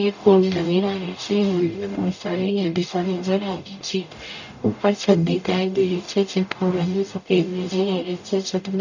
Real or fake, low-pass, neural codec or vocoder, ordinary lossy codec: fake; 7.2 kHz; codec, 44.1 kHz, 0.9 kbps, DAC; none